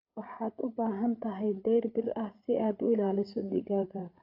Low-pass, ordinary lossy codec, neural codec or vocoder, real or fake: 5.4 kHz; AAC, 32 kbps; codec, 16 kHz, 8 kbps, FreqCodec, larger model; fake